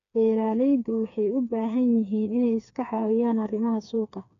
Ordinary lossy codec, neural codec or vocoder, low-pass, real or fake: none; codec, 16 kHz, 4 kbps, FreqCodec, smaller model; 7.2 kHz; fake